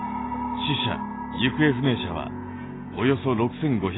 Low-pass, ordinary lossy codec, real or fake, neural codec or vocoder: 7.2 kHz; AAC, 16 kbps; real; none